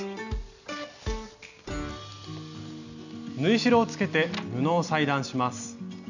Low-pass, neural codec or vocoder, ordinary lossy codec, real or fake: 7.2 kHz; none; none; real